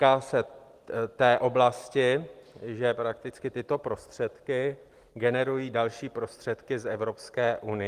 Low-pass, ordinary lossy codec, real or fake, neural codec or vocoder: 14.4 kHz; Opus, 24 kbps; real; none